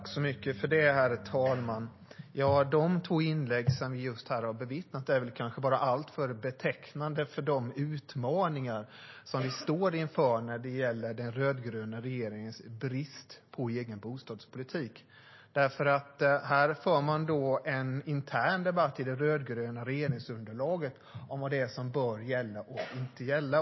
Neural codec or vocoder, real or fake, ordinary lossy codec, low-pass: none; real; MP3, 24 kbps; 7.2 kHz